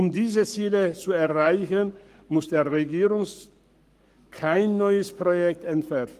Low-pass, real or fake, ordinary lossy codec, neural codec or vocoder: 14.4 kHz; fake; Opus, 32 kbps; codec, 44.1 kHz, 7.8 kbps, Pupu-Codec